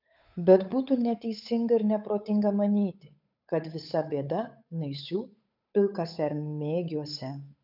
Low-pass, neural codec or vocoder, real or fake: 5.4 kHz; codec, 16 kHz, 8 kbps, FunCodec, trained on Chinese and English, 25 frames a second; fake